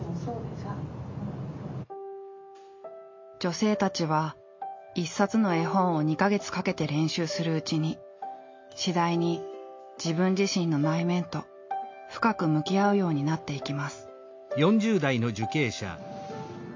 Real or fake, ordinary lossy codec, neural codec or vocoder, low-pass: real; MP3, 48 kbps; none; 7.2 kHz